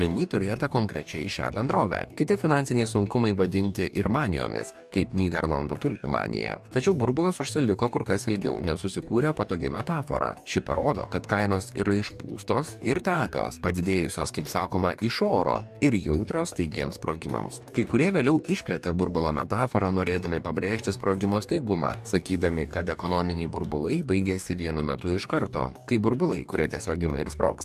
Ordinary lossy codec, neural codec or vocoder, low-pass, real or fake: AAC, 96 kbps; codec, 44.1 kHz, 2.6 kbps, DAC; 14.4 kHz; fake